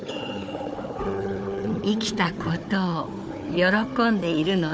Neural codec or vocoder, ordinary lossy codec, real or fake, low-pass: codec, 16 kHz, 4 kbps, FunCodec, trained on Chinese and English, 50 frames a second; none; fake; none